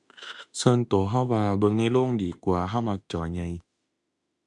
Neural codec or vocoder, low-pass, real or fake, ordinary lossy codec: autoencoder, 48 kHz, 32 numbers a frame, DAC-VAE, trained on Japanese speech; 10.8 kHz; fake; MP3, 96 kbps